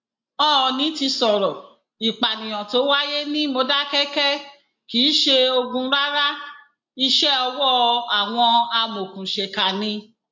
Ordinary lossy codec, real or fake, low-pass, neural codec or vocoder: MP3, 48 kbps; real; 7.2 kHz; none